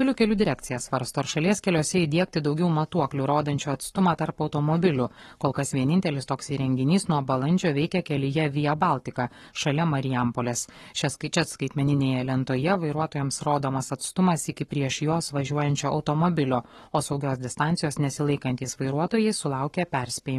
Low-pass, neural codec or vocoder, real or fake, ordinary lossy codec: 19.8 kHz; codec, 44.1 kHz, 7.8 kbps, Pupu-Codec; fake; AAC, 32 kbps